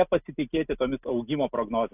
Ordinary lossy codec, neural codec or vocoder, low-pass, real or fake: AAC, 32 kbps; none; 3.6 kHz; real